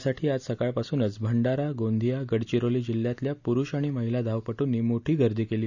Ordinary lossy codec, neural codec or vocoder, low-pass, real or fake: none; none; 7.2 kHz; real